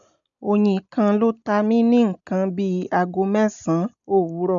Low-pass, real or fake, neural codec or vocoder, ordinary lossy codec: 7.2 kHz; real; none; none